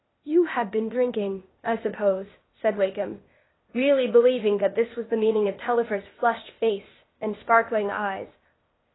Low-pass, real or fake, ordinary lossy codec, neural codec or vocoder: 7.2 kHz; fake; AAC, 16 kbps; codec, 16 kHz, 0.8 kbps, ZipCodec